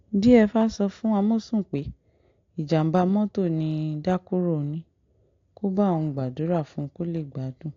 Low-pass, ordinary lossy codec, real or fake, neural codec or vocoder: 7.2 kHz; AAC, 48 kbps; real; none